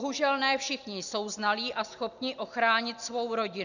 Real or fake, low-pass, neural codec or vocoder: real; 7.2 kHz; none